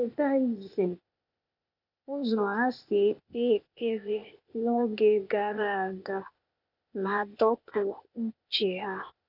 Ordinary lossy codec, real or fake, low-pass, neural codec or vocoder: none; fake; 5.4 kHz; codec, 16 kHz, 0.8 kbps, ZipCodec